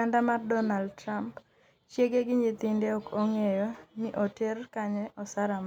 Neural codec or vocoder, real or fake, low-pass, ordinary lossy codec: none; real; 19.8 kHz; none